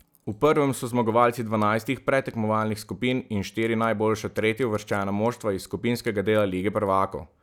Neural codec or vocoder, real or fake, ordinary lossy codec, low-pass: none; real; none; 19.8 kHz